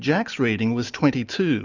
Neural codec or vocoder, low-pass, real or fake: none; 7.2 kHz; real